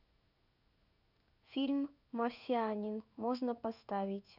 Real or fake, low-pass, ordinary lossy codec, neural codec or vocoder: fake; 5.4 kHz; none; codec, 16 kHz in and 24 kHz out, 1 kbps, XY-Tokenizer